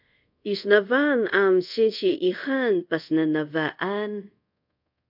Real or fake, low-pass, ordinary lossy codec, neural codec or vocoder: fake; 5.4 kHz; AAC, 48 kbps; codec, 24 kHz, 0.5 kbps, DualCodec